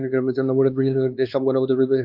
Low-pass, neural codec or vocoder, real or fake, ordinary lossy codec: 5.4 kHz; codec, 16 kHz, 2 kbps, X-Codec, WavLM features, trained on Multilingual LibriSpeech; fake; Opus, 32 kbps